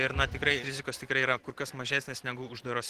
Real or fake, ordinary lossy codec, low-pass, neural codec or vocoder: fake; Opus, 16 kbps; 14.4 kHz; vocoder, 44.1 kHz, 128 mel bands every 512 samples, BigVGAN v2